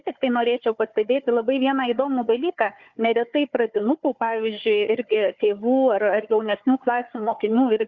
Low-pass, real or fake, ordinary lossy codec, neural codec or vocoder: 7.2 kHz; fake; Opus, 64 kbps; codec, 16 kHz, 4 kbps, FunCodec, trained on Chinese and English, 50 frames a second